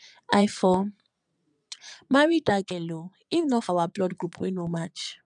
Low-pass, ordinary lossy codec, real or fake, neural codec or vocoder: 9.9 kHz; none; fake; vocoder, 22.05 kHz, 80 mel bands, Vocos